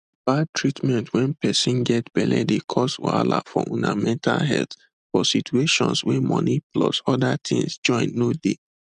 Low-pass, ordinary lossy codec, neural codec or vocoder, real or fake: 9.9 kHz; none; none; real